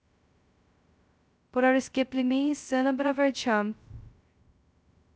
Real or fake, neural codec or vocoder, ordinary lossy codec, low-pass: fake; codec, 16 kHz, 0.2 kbps, FocalCodec; none; none